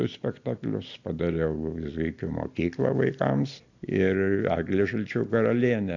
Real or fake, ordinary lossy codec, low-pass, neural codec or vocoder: real; AAC, 48 kbps; 7.2 kHz; none